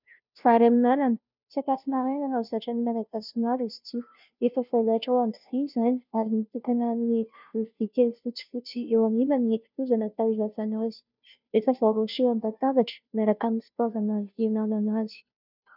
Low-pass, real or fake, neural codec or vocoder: 5.4 kHz; fake; codec, 16 kHz, 0.5 kbps, FunCodec, trained on Chinese and English, 25 frames a second